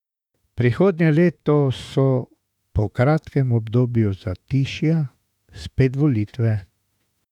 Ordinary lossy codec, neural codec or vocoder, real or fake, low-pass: none; autoencoder, 48 kHz, 32 numbers a frame, DAC-VAE, trained on Japanese speech; fake; 19.8 kHz